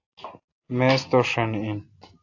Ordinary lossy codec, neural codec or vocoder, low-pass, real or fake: AAC, 48 kbps; none; 7.2 kHz; real